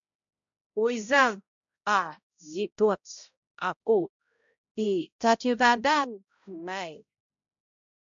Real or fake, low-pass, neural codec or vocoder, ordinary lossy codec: fake; 7.2 kHz; codec, 16 kHz, 0.5 kbps, X-Codec, HuBERT features, trained on balanced general audio; MP3, 64 kbps